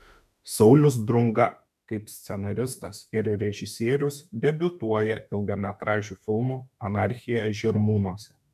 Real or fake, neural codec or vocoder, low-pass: fake; autoencoder, 48 kHz, 32 numbers a frame, DAC-VAE, trained on Japanese speech; 14.4 kHz